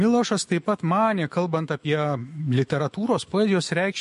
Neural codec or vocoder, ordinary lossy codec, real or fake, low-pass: autoencoder, 48 kHz, 128 numbers a frame, DAC-VAE, trained on Japanese speech; MP3, 48 kbps; fake; 14.4 kHz